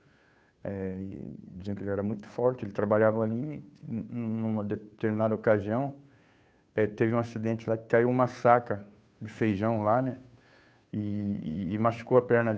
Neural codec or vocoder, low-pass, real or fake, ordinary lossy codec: codec, 16 kHz, 2 kbps, FunCodec, trained on Chinese and English, 25 frames a second; none; fake; none